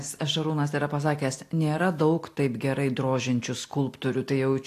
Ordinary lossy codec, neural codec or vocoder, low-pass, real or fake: AAC, 64 kbps; none; 14.4 kHz; real